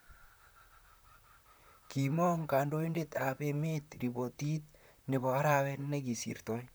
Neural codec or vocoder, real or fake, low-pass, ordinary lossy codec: vocoder, 44.1 kHz, 128 mel bands, Pupu-Vocoder; fake; none; none